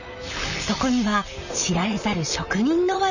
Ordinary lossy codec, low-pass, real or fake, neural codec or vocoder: none; 7.2 kHz; fake; vocoder, 44.1 kHz, 128 mel bands, Pupu-Vocoder